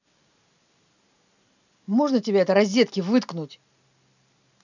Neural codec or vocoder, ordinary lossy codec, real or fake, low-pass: none; none; real; 7.2 kHz